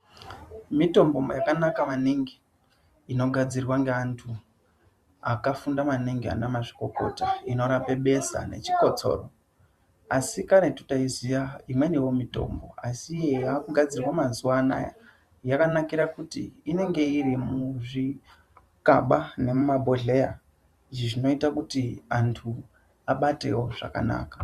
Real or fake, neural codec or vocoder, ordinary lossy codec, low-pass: fake; vocoder, 44.1 kHz, 128 mel bands every 512 samples, BigVGAN v2; Opus, 64 kbps; 14.4 kHz